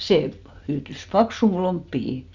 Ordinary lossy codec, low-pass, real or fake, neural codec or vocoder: Opus, 64 kbps; 7.2 kHz; real; none